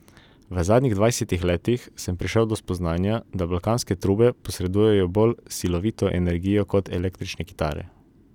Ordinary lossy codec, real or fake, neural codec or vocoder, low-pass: none; real; none; 19.8 kHz